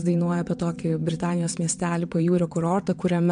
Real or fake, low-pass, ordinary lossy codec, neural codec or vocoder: fake; 9.9 kHz; MP3, 64 kbps; vocoder, 22.05 kHz, 80 mel bands, WaveNeXt